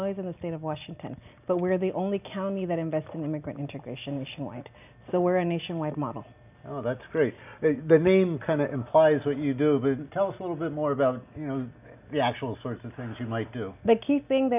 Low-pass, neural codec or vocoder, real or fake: 3.6 kHz; none; real